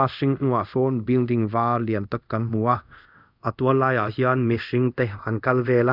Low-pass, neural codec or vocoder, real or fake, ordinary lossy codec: 5.4 kHz; codec, 24 kHz, 0.5 kbps, DualCodec; fake; none